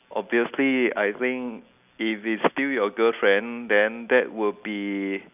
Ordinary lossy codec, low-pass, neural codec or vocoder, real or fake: none; 3.6 kHz; none; real